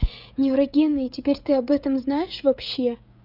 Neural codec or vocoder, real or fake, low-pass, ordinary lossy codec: codec, 16 kHz, 16 kbps, FreqCodec, smaller model; fake; 5.4 kHz; none